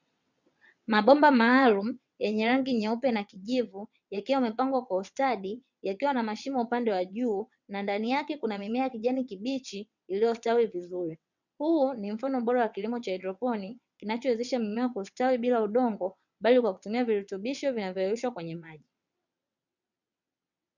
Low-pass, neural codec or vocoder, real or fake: 7.2 kHz; vocoder, 22.05 kHz, 80 mel bands, WaveNeXt; fake